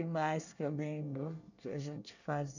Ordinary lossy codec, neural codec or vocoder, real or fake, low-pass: none; codec, 24 kHz, 1 kbps, SNAC; fake; 7.2 kHz